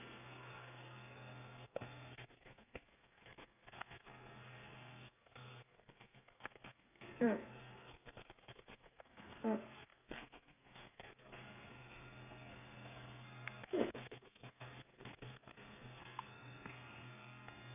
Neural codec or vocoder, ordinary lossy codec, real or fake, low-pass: codec, 32 kHz, 1.9 kbps, SNAC; none; fake; 3.6 kHz